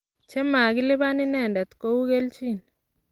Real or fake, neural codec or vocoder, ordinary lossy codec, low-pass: real; none; Opus, 24 kbps; 19.8 kHz